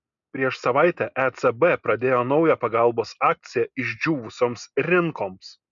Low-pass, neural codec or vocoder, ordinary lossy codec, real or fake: 7.2 kHz; none; MP3, 96 kbps; real